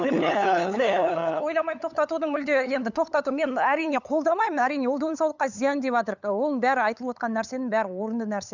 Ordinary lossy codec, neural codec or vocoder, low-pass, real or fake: none; codec, 16 kHz, 8 kbps, FunCodec, trained on LibriTTS, 25 frames a second; 7.2 kHz; fake